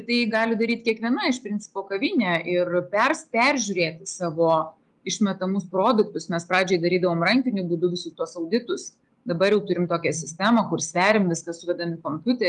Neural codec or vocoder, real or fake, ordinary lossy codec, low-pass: none; real; Opus, 32 kbps; 10.8 kHz